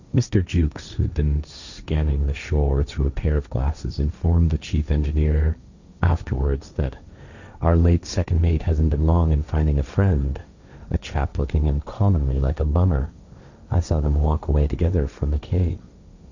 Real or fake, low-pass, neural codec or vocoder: fake; 7.2 kHz; codec, 16 kHz, 1.1 kbps, Voila-Tokenizer